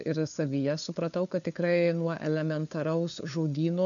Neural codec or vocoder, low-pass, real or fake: codec, 16 kHz, 4 kbps, FunCodec, trained on LibriTTS, 50 frames a second; 7.2 kHz; fake